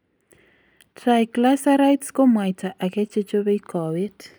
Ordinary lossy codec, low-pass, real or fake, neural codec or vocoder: none; none; real; none